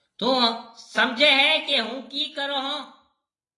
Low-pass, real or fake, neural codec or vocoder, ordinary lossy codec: 10.8 kHz; real; none; AAC, 32 kbps